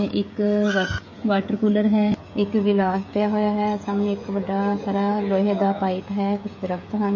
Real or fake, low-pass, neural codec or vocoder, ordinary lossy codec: fake; 7.2 kHz; codec, 16 kHz, 16 kbps, FreqCodec, smaller model; MP3, 32 kbps